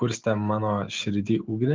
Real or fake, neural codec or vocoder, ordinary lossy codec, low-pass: real; none; Opus, 32 kbps; 7.2 kHz